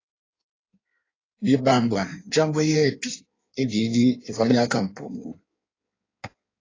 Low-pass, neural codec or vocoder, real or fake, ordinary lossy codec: 7.2 kHz; codec, 16 kHz in and 24 kHz out, 1.1 kbps, FireRedTTS-2 codec; fake; AAC, 32 kbps